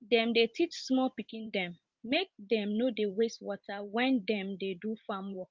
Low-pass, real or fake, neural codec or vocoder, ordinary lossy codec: 7.2 kHz; fake; vocoder, 44.1 kHz, 128 mel bands every 512 samples, BigVGAN v2; Opus, 24 kbps